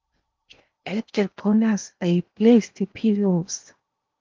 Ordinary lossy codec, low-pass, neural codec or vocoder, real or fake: Opus, 24 kbps; 7.2 kHz; codec, 16 kHz in and 24 kHz out, 0.8 kbps, FocalCodec, streaming, 65536 codes; fake